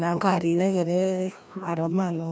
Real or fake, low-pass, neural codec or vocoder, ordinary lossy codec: fake; none; codec, 16 kHz, 1 kbps, FreqCodec, larger model; none